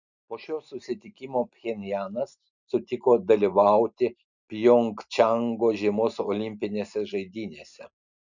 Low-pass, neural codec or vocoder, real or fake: 7.2 kHz; none; real